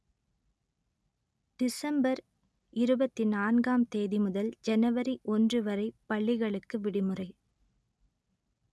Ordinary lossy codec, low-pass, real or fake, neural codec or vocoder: none; none; real; none